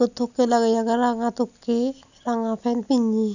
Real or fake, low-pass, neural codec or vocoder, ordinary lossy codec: real; 7.2 kHz; none; none